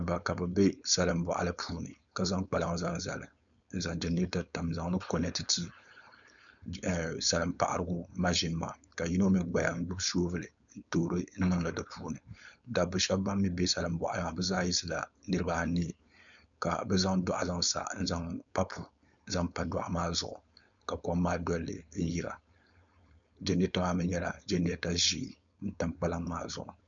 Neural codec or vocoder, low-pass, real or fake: codec, 16 kHz, 4.8 kbps, FACodec; 7.2 kHz; fake